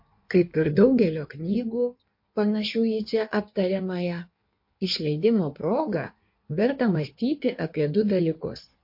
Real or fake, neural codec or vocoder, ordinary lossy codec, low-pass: fake; codec, 16 kHz in and 24 kHz out, 1.1 kbps, FireRedTTS-2 codec; MP3, 32 kbps; 5.4 kHz